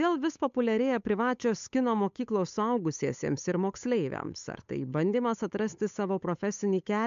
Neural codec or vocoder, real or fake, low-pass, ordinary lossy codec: codec, 16 kHz, 8 kbps, FunCodec, trained on Chinese and English, 25 frames a second; fake; 7.2 kHz; MP3, 64 kbps